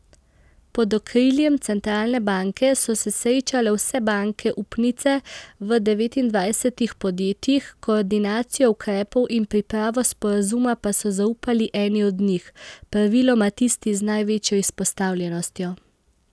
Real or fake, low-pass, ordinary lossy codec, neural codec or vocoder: real; none; none; none